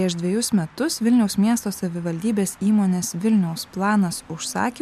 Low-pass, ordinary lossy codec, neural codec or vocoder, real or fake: 14.4 kHz; MP3, 96 kbps; none; real